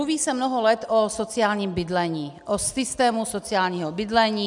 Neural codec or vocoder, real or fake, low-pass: none; real; 10.8 kHz